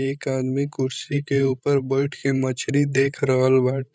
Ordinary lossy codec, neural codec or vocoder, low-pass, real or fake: none; codec, 16 kHz, 16 kbps, FreqCodec, larger model; none; fake